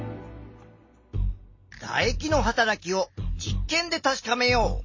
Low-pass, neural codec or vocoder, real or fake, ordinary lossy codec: 7.2 kHz; none; real; MP3, 32 kbps